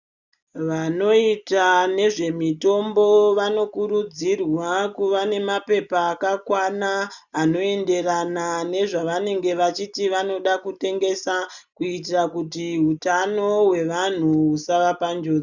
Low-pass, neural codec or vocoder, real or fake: 7.2 kHz; none; real